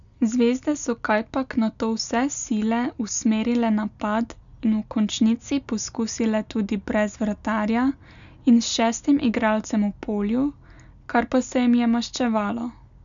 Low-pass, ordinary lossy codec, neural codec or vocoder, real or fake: 7.2 kHz; none; none; real